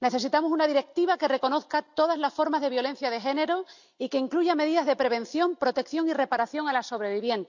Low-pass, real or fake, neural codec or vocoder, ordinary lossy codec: 7.2 kHz; real; none; none